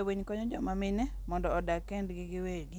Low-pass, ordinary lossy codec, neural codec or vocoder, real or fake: none; none; none; real